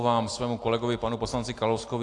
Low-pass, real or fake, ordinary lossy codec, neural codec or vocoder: 10.8 kHz; real; AAC, 48 kbps; none